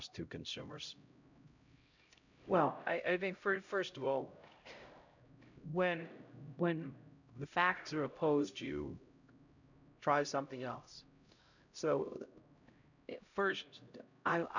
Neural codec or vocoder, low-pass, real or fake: codec, 16 kHz, 0.5 kbps, X-Codec, HuBERT features, trained on LibriSpeech; 7.2 kHz; fake